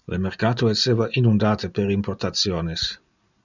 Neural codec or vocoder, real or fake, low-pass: none; real; 7.2 kHz